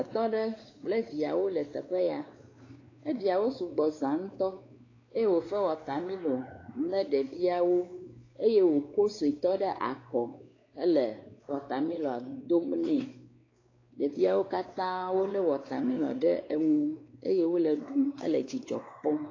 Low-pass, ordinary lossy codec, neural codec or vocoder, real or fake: 7.2 kHz; AAC, 32 kbps; codec, 16 kHz, 4 kbps, X-Codec, WavLM features, trained on Multilingual LibriSpeech; fake